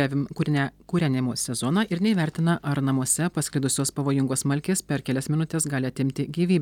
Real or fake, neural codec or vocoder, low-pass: real; none; 19.8 kHz